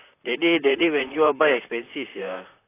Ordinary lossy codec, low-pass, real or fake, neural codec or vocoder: AAC, 24 kbps; 3.6 kHz; fake; vocoder, 44.1 kHz, 128 mel bands, Pupu-Vocoder